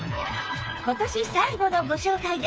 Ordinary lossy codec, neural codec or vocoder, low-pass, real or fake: none; codec, 16 kHz, 4 kbps, FreqCodec, smaller model; none; fake